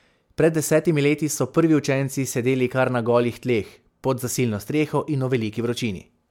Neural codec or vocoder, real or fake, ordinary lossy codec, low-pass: none; real; MP3, 96 kbps; 19.8 kHz